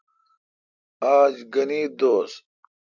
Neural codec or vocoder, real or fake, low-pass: none; real; 7.2 kHz